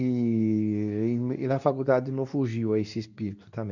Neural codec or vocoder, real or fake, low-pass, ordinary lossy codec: codec, 24 kHz, 0.9 kbps, WavTokenizer, medium speech release version 2; fake; 7.2 kHz; none